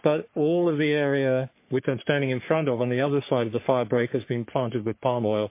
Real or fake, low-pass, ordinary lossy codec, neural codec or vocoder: fake; 3.6 kHz; MP3, 24 kbps; codec, 44.1 kHz, 3.4 kbps, Pupu-Codec